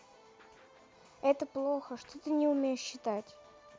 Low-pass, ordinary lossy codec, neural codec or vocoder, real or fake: none; none; none; real